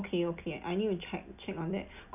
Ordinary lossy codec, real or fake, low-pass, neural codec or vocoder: none; real; 3.6 kHz; none